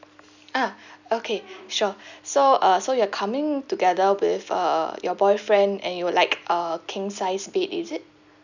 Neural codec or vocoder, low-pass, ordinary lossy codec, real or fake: none; 7.2 kHz; none; real